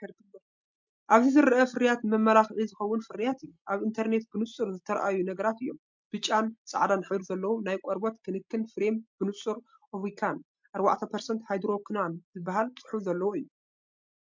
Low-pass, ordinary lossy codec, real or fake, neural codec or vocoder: 7.2 kHz; MP3, 64 kbps; real; none